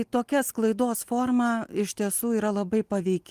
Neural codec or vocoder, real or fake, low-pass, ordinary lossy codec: none; real; 14.4 kHz; Opus, 24 kbps